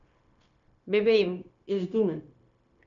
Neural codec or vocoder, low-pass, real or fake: codec, 16 kHz, 0.9 kbps, LongCat-Audio-Codec; 7.2 kHz; fake